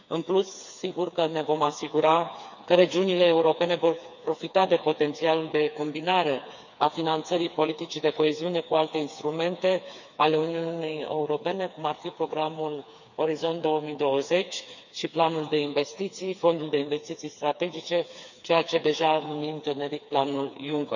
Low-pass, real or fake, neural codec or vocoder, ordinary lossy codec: 7.2 kHz; fake; codec, 16 kHz, 4 kbps, FreqCodec, smaller model; none